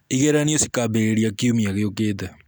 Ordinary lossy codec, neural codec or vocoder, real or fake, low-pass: none; none; real; none